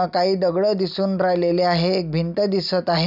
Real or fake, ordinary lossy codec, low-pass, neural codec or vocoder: real; none; 5.4 kHz; none